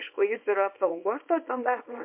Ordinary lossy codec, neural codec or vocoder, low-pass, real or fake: MP3, 32 kbps; codec, 24 kHz, 0.9 kbps, WavTokenizer, small release; 3.6 kHz; fake